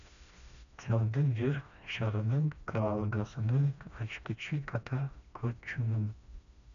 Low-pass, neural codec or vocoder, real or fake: 7.2 kHz; codec, 16 kHz, 1 kbps, FreqCodec, smaller model; fake